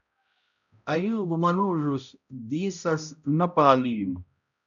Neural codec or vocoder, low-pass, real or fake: codec, 16 kHz, 0.5 kbps, X-Codec, HuBERT features, trained on balanced general audio; 7.2 kHz; fake